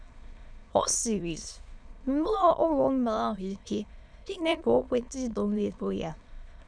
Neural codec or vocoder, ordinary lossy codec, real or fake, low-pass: autoencoder, 22.05 kHz, a latent of 192 numbers a frame, VITS, trained on many speakers; none; fake; 9.9 kHz